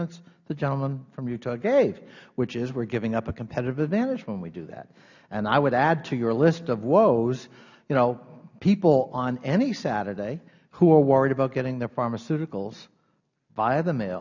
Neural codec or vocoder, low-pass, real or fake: none; 7.2 kHz; real